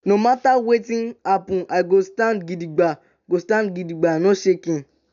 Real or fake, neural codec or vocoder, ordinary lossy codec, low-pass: real; none; none; 7.2 kHz